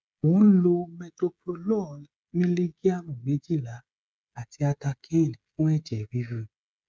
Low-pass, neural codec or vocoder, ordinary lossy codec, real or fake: none; codec, 16 kHz, 16 kbps, FreqCodec, smaller model; none; fake